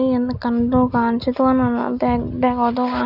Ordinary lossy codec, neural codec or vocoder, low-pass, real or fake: none; none; 5.4 kHz; real